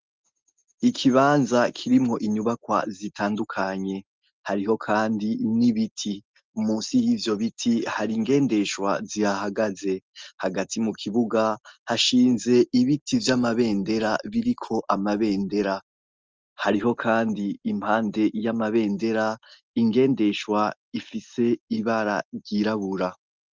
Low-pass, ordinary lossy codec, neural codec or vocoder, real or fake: 7.2 kHz; Opus, 24 kbps; none; real